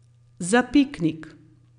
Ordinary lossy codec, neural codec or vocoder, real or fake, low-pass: none; none; real; 9.9 kHz